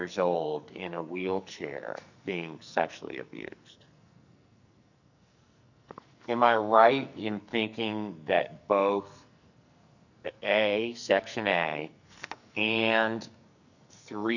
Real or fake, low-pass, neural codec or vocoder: fake; 7.2 kHz; codec, 44.1 kHz, 2.6 kbps, SNAC